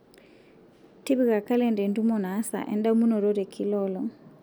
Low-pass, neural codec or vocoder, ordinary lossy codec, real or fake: none; none; none; real